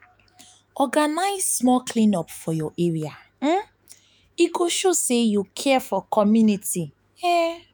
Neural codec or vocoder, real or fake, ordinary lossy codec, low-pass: autoencoder, 48 kHz, 128 numbers a frame, DAC-VAE, trained on Japanese speech; fake; none; none